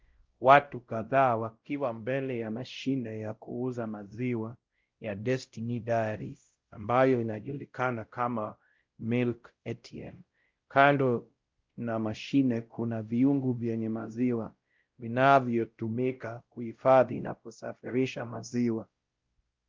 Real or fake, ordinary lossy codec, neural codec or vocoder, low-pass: fake; Opus, 32 kbps; codec, 16 kHz, 0.5 kbps, X-Codec, WavLM features, trained on Multilingual LibriSpeech; 7.2 kHz